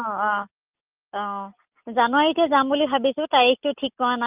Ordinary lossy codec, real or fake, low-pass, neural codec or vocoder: Opus, 24 kbps; real; 3.6 kHz; none